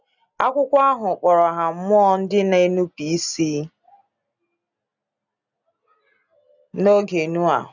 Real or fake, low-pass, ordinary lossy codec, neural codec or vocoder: real; 7.2 kHz; none; none